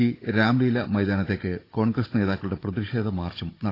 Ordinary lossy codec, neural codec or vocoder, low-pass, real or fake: AAC, 24 kbps; codec, 16 kHz, 16 kbps, FunCodec, trained on Chinese and English, 50 frames a second; 5.4 kHz; fake